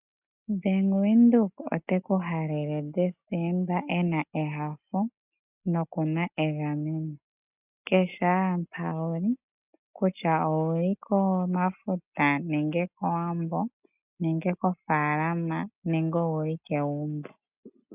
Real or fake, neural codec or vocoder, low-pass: real; none; 3.6 kHz